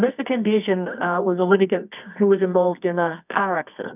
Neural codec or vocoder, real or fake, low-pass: codec, 24 kHz, 0.9 kbps, WavTokenizer, medium music audio release; fake; 3.6 kHz